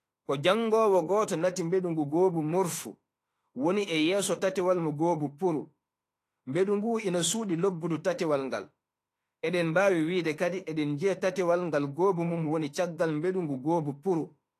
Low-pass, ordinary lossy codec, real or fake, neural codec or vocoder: 14.4 kHz; AAC, 48 kbps; fake; autoencoder, 48 kHz, 32 numbers a frame, DAC-VAE, trained on Japanese speech